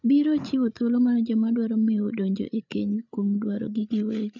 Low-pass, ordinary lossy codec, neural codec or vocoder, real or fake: 7.2 kHz; none; codec, 16 kHz, 8 kbps, FreqCodec, larger model; fake